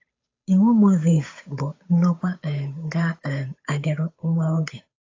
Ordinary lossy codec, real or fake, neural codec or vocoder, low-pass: AAC, 32 kbps; fake; codec, 16 kHz, 8 kbps, FunCodec, trained on Chinese and English, 25 frames a second; 7.2 kHz